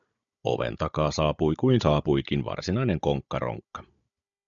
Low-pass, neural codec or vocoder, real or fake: 7.2 kHz; codec, 16 kHz, 16 kbps, FunCodec, trained on Chinese and English, 50 frames a second; fake